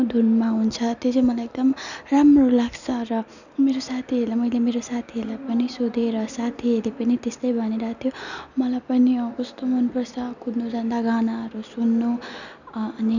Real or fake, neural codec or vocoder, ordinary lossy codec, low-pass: real; none; none; 7.2 kHz